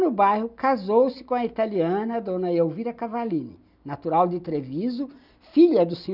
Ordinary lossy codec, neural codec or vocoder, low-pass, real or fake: none; none; 5.4 kHz; real